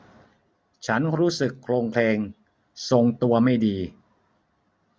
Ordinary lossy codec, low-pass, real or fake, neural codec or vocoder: none; none; real; none